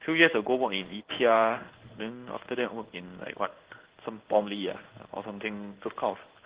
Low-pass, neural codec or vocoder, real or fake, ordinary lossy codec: 3.6 kHz; codec, 16 kHz in and 24 kHz out, 1 kbps, XY-Tokenizer; fake; Opus, 16 kbps